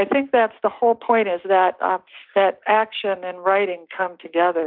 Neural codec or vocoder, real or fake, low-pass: none; real; 5.4 kHz